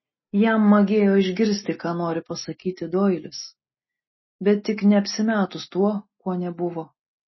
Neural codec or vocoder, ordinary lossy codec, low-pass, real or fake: none; MP3, 24 kbps; 7.2 kHz; real